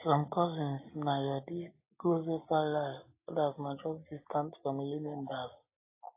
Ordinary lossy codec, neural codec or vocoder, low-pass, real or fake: AAC, 32 kbps; none; 3.6 kHz; real